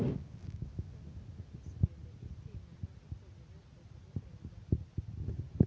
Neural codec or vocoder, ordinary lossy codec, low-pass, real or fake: none; none; none; real